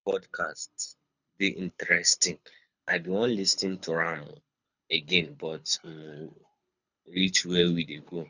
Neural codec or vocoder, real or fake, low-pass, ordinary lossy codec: codec, 24 kHz, 6 kbps, HILCodec; fake; 7.2 kHz; none